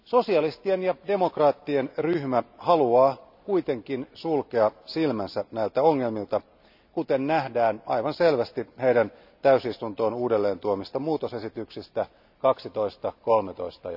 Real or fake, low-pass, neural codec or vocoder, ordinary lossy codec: real; 5.4 kHz; none; none